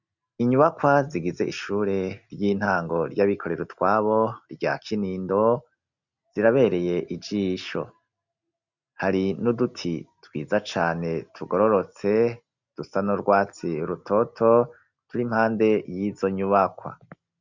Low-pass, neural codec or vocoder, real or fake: 7.2 kHz; none; real